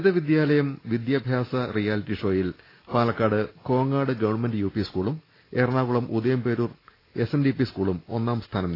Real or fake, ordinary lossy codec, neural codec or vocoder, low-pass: real; AAC, 24 kbps; none; 5.4 kHz